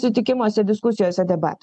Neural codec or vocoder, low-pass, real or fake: none; 10.8 kHz; real